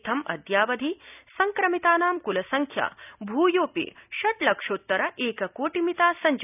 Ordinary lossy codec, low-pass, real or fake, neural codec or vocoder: none; 3.6 kHz; real; none